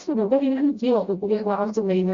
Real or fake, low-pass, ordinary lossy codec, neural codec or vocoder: fake; 7.2 kHz; Opus, 64 kbps; codec, 16 kHz, 0.5 kbps, FreqCodec, smaller model